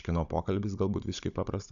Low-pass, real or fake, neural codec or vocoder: 7.2 kHz; fake; codec, 16 kHz, 16 kbps, FunCodec, trained on Chinese and English, 50 frames a second